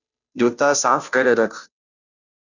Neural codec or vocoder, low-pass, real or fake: codec, 16 kHz, 0.5 kbps, FunCodec, trained on Chinese and English, 25 frames a second; 7.2 kHz; fake